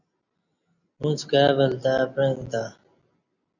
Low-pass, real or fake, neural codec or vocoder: 7.2 kHz; real; none